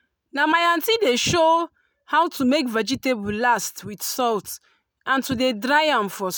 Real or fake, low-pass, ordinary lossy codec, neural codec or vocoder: real; none; none; none